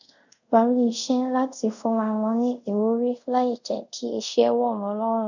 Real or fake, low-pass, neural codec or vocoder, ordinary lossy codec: fake; 7.2 kHz; codec, 24 kHz, 0.5 kbps, DualCodec; none